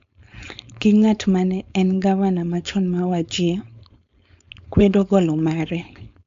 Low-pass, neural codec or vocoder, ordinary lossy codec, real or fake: 7.2 kHz; codec, 16 kHz, 4.8 kbps, FACodec; AAC, 64 kbps; fake